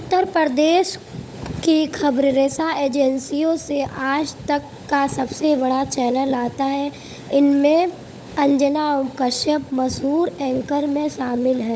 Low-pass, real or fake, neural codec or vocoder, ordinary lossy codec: none; fake; codec, 16 kHz, 16 kbps, FunCodec, trained on LibriTTS, 50 frames a second; none